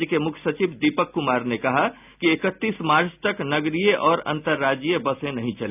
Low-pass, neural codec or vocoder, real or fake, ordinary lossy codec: 3.6 kHz; none; real; none